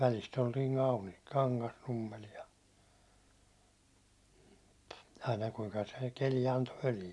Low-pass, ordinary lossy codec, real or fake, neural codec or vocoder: none; none; real; none